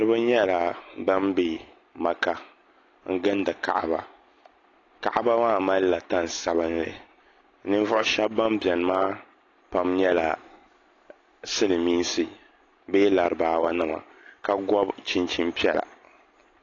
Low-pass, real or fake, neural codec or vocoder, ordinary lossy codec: 7.2 kHz; real; none; AAC, 32 kbps